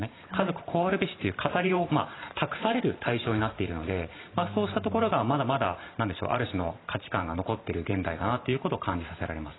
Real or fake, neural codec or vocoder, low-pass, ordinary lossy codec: fake; vocoder, 44.1 kHz, 128 mel bands every 512 samples, BigVGAN v2; 7.2 kHz; AAC, 16 kbps